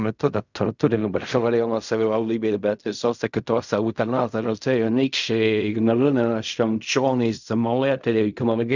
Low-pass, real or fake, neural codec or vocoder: 7.2 kHz; fake; codec, 16 kHz in and 24 kHz out, 0.4 kbps, LongCat-Audio-Codec, fine tuned four codebook decoder